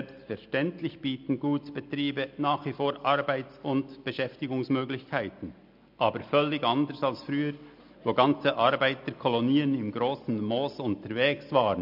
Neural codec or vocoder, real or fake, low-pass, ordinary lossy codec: none; real; 5.4 kHz; MP3, 48 kbps